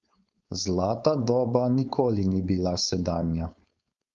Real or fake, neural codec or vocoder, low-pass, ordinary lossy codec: fake; codec, 16 kHz, 4.8 kbps, FACodec; 7.2 kHz; Opus, 32 kbps